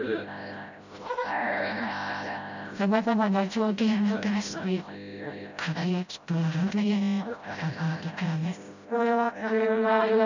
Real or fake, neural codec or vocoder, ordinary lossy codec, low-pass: fake; codec, 16 kHz, 0.5 kbps, FreqCodec, smaller model; none; 7.2 kHz